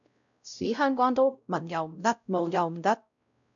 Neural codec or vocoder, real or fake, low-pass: codec, 16 kHz, 0.5 kbps, X-Codec, WavLM features, trained on Multilingual LibriSpeech; fake; 7.2 kHz